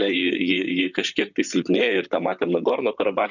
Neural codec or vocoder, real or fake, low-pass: vocoder, 44.1 kHz, 128 mel bands, Pupu-Vocoder; fake; 7.2 kHz